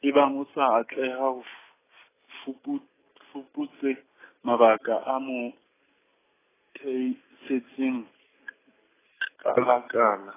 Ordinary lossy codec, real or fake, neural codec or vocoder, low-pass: AAC, 16 kbps; real; none; 3.6 kHz